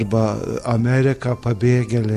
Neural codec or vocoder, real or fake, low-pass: none; real; 14.4 kHz